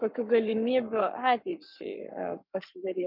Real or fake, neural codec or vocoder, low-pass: fake; codec, 44.1 kHz, 7.8 kbps, Pupu-Codec; 5.4 kHz